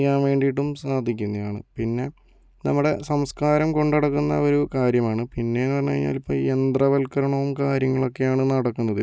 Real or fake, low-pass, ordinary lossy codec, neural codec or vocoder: real; none; none; none